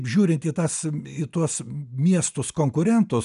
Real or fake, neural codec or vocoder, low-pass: real; none; 10.8 kHz